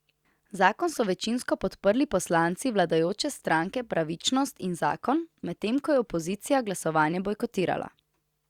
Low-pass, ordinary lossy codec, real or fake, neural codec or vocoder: 19.8 kHz; Opus, 64 kbps; real; none